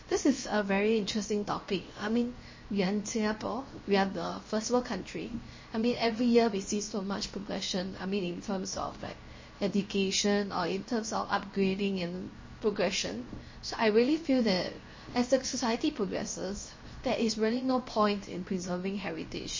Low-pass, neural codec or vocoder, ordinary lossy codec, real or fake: 7.2 kHz; codec, 16 kHz, 0.7 kbps, FocalCodec; MP3, 32 kbps; fake